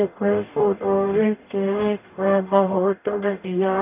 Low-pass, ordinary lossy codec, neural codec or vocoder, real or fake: 3.6 kHz; none; codec, 44.1 kHz, 0.9 kbps, DAC; fake